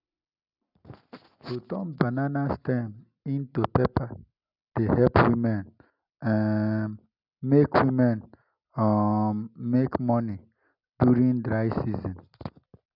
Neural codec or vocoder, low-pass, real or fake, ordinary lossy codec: none; 5.4 kHz; real; none